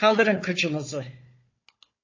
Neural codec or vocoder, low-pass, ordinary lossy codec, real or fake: codec, 16 kHz, 4 kbps, FunCodec, trained on Chinese and English, 50 frames a second; 7.2 kHz; MP3, 32 kbps; fake